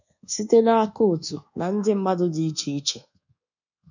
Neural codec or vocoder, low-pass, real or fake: codec, 24 kHz, 1.2 kbps, DualCodec; 7.2 kHz; fake